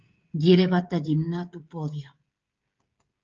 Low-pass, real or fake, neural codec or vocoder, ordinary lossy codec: 7.2 kHz; fake; codec, 16 kHz, 16 kbps, FreqCodec, smaller model; Opus, 24 kbps